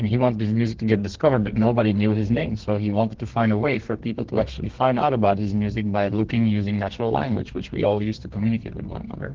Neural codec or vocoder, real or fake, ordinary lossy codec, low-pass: codec, 32 kHz, 1.9 kbps, SNAC; fake; Opus, 16 kbps; 7.2 kHz